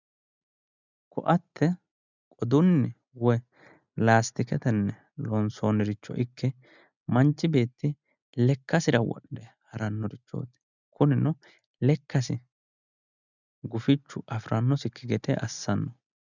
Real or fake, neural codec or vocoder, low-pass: real; none; 7.2 kHz